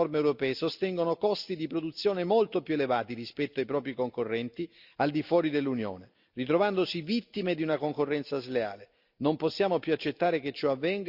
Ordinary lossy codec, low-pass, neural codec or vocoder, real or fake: Opus, 64 kbps; 5.4 kHz; none; real